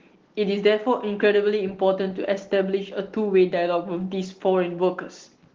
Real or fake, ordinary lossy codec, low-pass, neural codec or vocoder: real; Opus, 16 kbps; 7.2 kHz; none